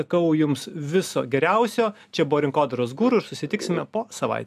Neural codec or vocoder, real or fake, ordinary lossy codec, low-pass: none; real; AAC, 96 kbps; 14.4 kHz